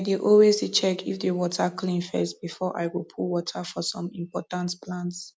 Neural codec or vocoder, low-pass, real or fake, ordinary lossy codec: none; none; real; none